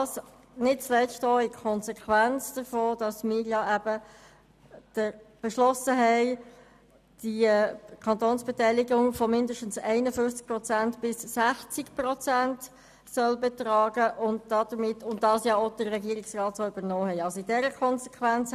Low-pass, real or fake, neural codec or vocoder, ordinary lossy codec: 14.4 kHz; real; none; none